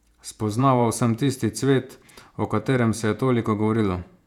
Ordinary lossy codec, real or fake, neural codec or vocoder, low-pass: none; fake; vocoder, 48 kHz, 128 mel bands, Vocos; 19.8 kHz